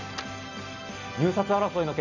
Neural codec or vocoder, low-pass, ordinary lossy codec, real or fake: none; 7.2 kHz; none; real